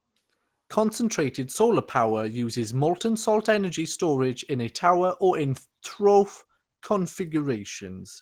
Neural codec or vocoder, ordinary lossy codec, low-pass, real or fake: none; Opus, 16 kbps; 19.8 kHz; real